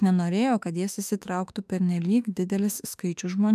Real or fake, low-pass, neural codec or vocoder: fake; 14.4 kHz; autoencoder, 48 kHz, 32 numbers a frame, DAC-VAE, trained on Japanese speech